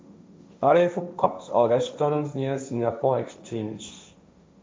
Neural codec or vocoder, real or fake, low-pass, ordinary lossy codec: codec, 16 kHz, 1.1 kbps, Voila-Tokenizer; fake; none; none